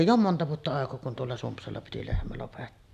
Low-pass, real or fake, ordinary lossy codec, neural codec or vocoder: 14.4 kHz; real; none; none